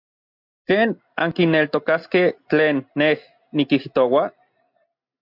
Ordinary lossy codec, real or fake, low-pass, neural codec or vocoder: MP3, 48 kbps; real; 5.4 kHz; none